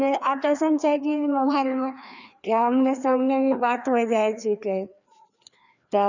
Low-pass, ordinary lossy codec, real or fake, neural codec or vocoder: 7.2 kHz; none; fake; codec, 16 kHz, 2 kbps, FreqCodec, larger model